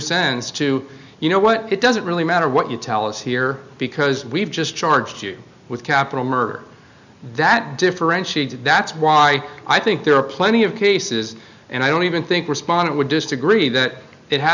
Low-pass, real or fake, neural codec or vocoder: 7.2 kHz; real; none